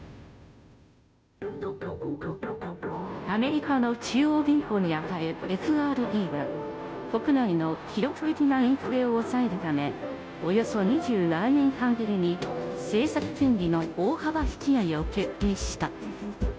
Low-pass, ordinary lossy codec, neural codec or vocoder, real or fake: none; none; codec, 16 kHz, 0.5 kbps, FunCodec, trained on Chinese and English, 25 frames a second; fake